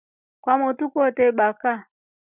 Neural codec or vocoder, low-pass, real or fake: none; 3.6 kHz; real